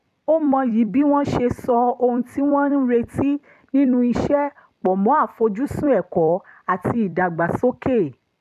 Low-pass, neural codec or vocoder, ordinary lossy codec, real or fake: 14.4 kHz; vocoder, 44.1 kHz, 128 mel bands every 256 samples, BigVGAN v2; MP3, 96 kbps; fake